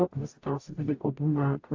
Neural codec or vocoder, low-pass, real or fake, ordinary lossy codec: codec, 44.1 kHz, 0.9 kbps, DAC; 7.2 kHz; fake; AAC, 48 kbps